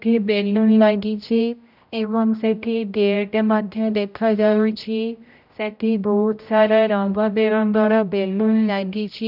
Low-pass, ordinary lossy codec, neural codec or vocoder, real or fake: 5.4 kHz; none; codec, 16 kHz, 0.5 kbps, X-Codec, HuBERT features, trained on general audio; fake